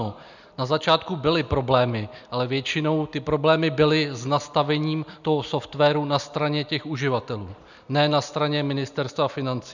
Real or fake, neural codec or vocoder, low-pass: real; none; 7.2 kHz